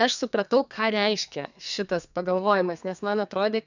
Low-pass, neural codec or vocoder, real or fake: 7.2 kHz; codec, 32 kHz, 1.9 kbps, SNAC; fake